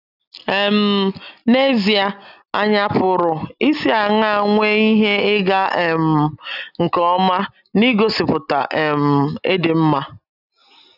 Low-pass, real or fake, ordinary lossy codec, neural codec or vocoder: 5.4 kHz; real; none; none